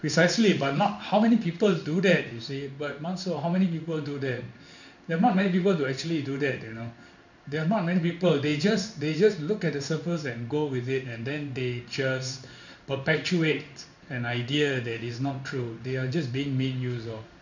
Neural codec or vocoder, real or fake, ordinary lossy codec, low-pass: codec, 16 kHz in and 24 kHz out, 1 kbps, XY-Tokenizer; fake; none; 7.2 kHz